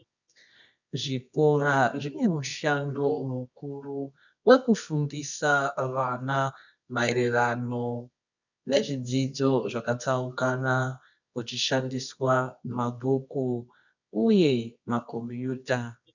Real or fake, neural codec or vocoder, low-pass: fake; codec, 24 kHz, 0.9 kbps, WavTokenizer, medium music audio release; 7.2 kHz